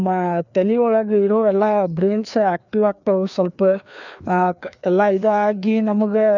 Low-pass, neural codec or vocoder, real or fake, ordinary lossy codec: 7.2 kHz; codec, 16 kHz, 2 kbps, FreqCodec, larger model; fake; none